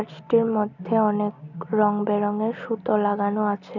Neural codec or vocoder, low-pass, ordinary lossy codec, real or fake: none; 7.2 kHz; none; real